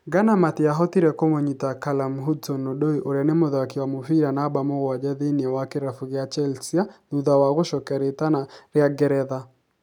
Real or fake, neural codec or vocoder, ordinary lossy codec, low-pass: real; none; none; 19.8 kHz